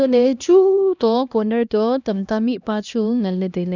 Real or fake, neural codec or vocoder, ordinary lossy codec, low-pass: fake; codec, 16 kHz, 1 kbps, X-Codec, HuBERT features, trained on LibriSpeech; none; 7.2 kHz